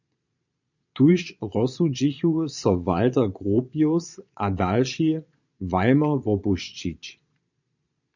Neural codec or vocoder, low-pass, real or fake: vocoder, 22.05 kHz, 80 mel bands, Vocos; 7.2 kHz; fake